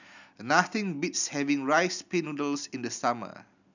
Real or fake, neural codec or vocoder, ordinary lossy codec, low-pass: real; none; none; 7.2 kHz